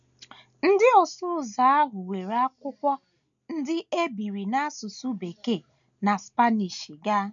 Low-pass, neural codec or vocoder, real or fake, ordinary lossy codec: 7.2 kHz; none; real; none